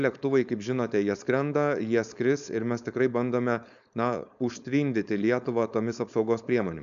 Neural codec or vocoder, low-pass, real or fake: codec, 16 kHz, 4.8 kbps, FACodec; 7.2 kHz; fake